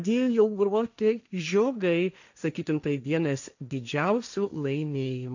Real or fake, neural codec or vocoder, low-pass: fake; codec, 16 kHz, 1.1 kbps, Voila-Tokenizer; 7.2 kHz